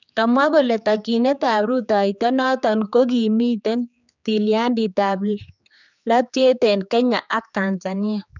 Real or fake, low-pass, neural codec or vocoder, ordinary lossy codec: fake; 7.2 kHz; codec, 16 kHz, 4 kbps, X-Codec, HuBERT features, trained on general audio; none